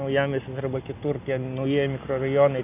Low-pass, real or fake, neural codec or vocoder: 3.6 kHz; real; none